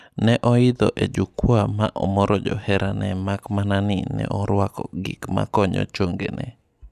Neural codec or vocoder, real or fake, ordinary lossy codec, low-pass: none; real; none; 14.4 kHz